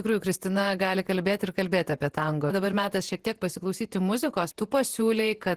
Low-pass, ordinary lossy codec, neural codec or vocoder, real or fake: 14.4 kHz; Opus, 16 kbps; vocoder, 48 kHz, 128 mel bands, Vocos; fake